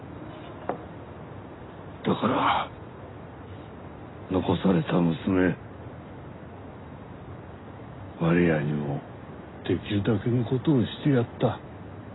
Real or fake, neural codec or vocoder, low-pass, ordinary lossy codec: real; none; 7.2 kHz; AAC, 16 kbps